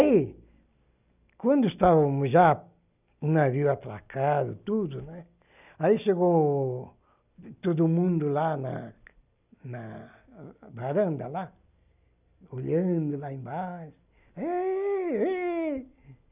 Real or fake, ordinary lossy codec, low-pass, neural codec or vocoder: real; none; 3.6 kHz; none